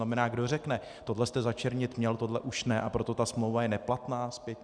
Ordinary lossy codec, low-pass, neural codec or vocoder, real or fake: Opus, 64 kbps; 9.9 kHz; none; real